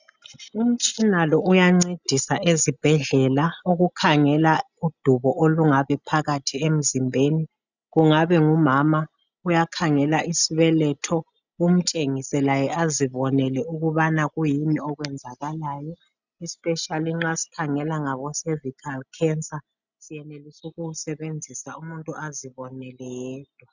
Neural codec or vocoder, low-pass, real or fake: none; 7.2 kHz; real